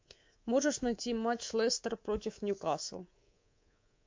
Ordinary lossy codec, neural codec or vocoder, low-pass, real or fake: MP3, 48 kbps; codec, 24 kHz, 3.1 kbps, DualCodec; 7.2 kHz; fake